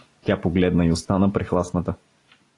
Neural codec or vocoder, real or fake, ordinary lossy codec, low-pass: none; real; AAC, 32 kbps; 10.8 kHz